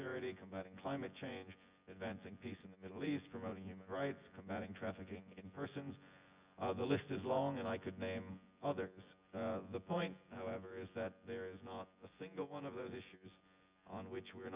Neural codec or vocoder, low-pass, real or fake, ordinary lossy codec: vocoder, 24 kHz, 100 mel bands, Vocos; 3.6 kHz; fake; Opus, 64 kbps